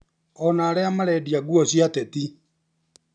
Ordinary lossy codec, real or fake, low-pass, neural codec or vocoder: none; real; 9.9 kHz; none